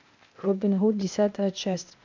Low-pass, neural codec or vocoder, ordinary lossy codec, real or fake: 7.2 kHz; codec, 16 kHz, 0.8 kbps, ZipCodec; MP3, 64 kbps; fake